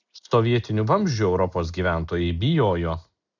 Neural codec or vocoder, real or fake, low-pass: none; real; 7.2 kHz